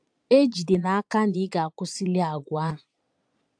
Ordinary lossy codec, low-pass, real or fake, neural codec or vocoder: AAC, 64 kbps; 9.9 kHz; fake; vocoder, 22.05 kHz, 80 mel bands, Vocos